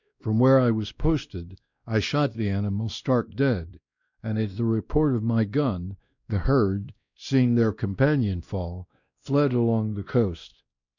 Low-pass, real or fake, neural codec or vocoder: 7.2 kHz; fake; codec, 16 kHz, 1 kbps, X-Codec, WavLM features, trained on Multilingual LibriSpeech